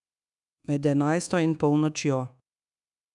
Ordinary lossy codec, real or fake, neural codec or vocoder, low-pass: none; fake; codec, 24 kHz, 1.2 kbps, DualCodec; 10.8 kHz